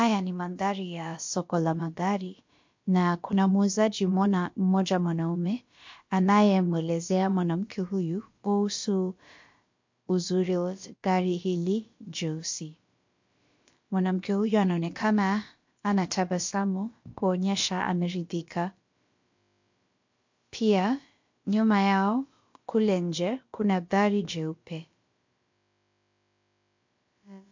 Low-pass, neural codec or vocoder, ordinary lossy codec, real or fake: 7.2 kHz; codec, 16 kHz, about 1 kbps, DyCAST, with the encoder's durations; MP3, 48 kbps; fake